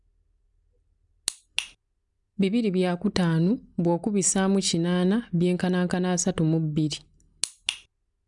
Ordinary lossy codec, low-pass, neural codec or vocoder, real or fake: none; 10.8 kHz; none; real